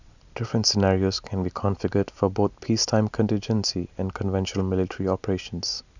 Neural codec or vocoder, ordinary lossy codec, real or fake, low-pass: none; none; real; 7.2 kHz